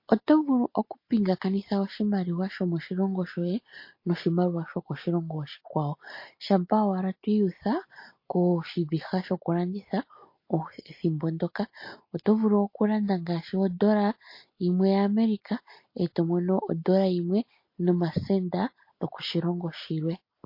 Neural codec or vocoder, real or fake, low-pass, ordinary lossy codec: none; real; 5.4 kHz; MP3, 32 kbps